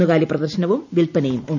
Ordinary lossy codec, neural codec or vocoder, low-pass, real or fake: none; none; 7.2 kHz; real